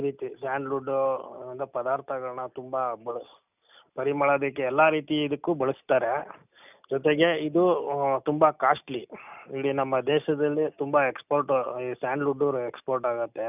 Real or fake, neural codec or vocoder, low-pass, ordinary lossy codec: real; none; 3.6 kHz; none